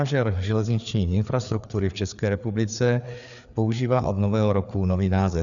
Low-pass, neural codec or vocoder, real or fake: 7.2 kHz; codec, 16 kHz, 4 kbps, FreqCodec, larger model; fake